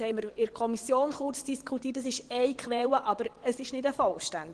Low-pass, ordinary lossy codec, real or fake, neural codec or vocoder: 9.9 kHz; Opus, 16 kbps; fake; vocoder, 22.05 kHz, 80 mel bands, WaveNeXt